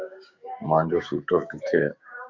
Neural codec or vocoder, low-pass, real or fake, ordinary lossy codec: codec, 16 kHz, 6 kbps, DAC; 7.2 kHz; fake; Opus, 64 kbps